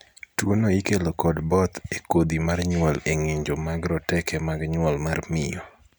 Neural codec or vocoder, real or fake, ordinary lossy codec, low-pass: none; real; none; none